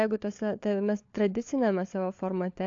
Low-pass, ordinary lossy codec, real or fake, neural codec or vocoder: 7.2 kHz; AAC, 48 kbps; fake; codec, 16 kHz, 4 kbps, FunCodec, trained on LibriTTS, 50 frames a second